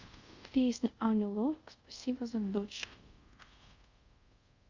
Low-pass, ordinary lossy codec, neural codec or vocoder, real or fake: 7.2 kHz; Opus, 64 kbps; codec, 24 kHz, 0.5 kbps, DualCodec; fake